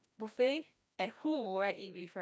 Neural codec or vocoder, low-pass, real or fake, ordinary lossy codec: codec, 16 kHz, 1 kbps, FreqCodec, larger model; none; fake; none